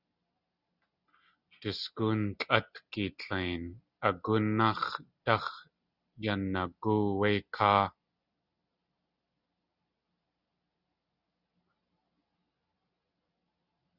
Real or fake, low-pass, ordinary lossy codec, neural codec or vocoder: real; 5.4 kHz; Opus, 64 kbps; none